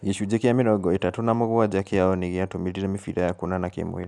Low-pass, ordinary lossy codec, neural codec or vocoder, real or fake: none; none; none; real